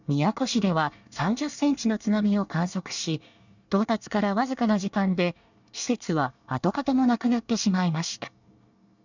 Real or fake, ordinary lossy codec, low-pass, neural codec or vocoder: fake; none; 7.2 kHz; codec, 24 kHz, 1 kbps, SNAC